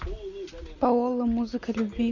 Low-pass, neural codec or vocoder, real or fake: 7.2 kHz; none; real